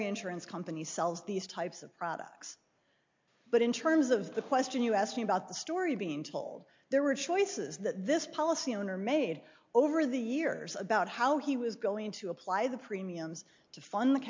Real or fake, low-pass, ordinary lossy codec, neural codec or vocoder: real; 7.2 kHz; MP3, 48 kbps; none